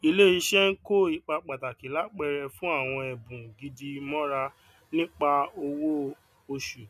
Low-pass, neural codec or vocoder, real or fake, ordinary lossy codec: 14.4 kHz; none; real; none